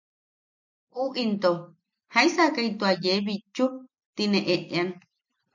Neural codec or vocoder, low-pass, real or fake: none; 7.2 kHz; real